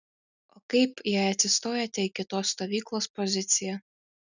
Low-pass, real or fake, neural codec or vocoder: 7.2 kHz; real; none